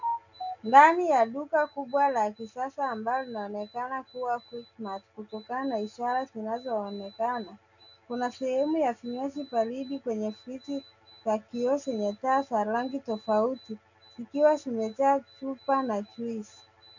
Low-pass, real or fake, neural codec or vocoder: 7.2 kHz; real; none